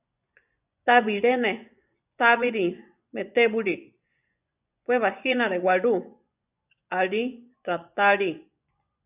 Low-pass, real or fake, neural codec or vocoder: 3.6 kHz; fake; vocoder, 24 kHz, 100 mel bands, Vocos